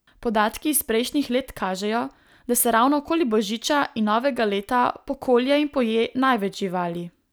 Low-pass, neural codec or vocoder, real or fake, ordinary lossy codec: none; none; real; none